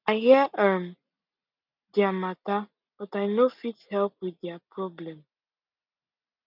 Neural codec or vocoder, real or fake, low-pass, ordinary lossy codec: none; real; 5.4 kHz; none